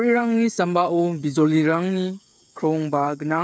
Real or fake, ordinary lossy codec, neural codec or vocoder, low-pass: fake; none; codec, 16 kHz, 8 kbps, FreqCodec, smaller model; none